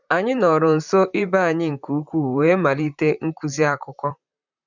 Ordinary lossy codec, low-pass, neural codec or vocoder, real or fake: none; 7.2 kHz; vocoder, 44.1 kHz, 128 mel bands, Pupu-Vocoder; fake